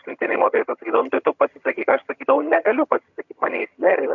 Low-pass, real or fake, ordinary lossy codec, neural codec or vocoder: 7.2 kHz; fake; MP3, 64 kbps; vocoder, 22.05 kHz, 80 mel bands, HiFi-GAN